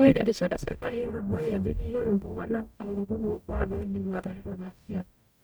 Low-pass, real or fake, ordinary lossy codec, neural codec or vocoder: none; fake; none; codec, 44.1 kHz, 0.9 kbps, DAC